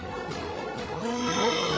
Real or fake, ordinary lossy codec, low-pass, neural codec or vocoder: fake; none; none; codec, 16 kHz, 16 kbps, FreqCodec, larger model